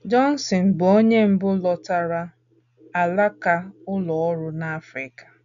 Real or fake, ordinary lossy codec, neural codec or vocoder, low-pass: real; none; none; 7.2 kHz